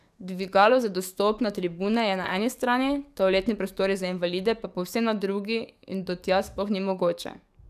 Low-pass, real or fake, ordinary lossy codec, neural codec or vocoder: 14.4 kHz; fake; none; codec, 44.1 kHz, 7.8 kbps, DAC